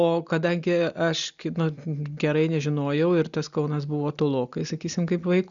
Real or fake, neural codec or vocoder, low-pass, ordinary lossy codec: real; none; 7.2 kHz; MP3, 96 kbps